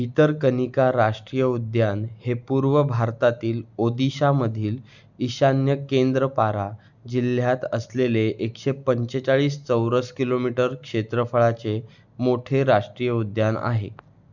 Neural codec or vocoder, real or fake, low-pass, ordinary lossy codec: none; real; 7.2 kHz; none